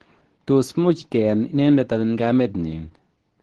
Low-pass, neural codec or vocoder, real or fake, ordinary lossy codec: 10.8 kHz; codec, 24 kHz, 0.9 kbps, WavTokenizer, medium speech release version 2; fake; Opus, 16 kbps